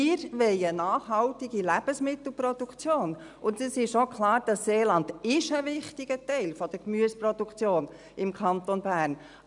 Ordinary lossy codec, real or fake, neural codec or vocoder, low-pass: none; real; none; 10.8 kHz